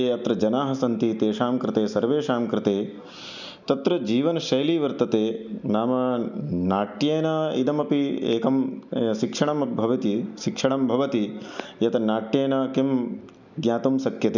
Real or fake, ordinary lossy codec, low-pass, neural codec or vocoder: real; none; 7.2 kHz; none